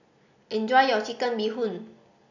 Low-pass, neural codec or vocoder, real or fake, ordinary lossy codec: 7.2 kHz; none; real; none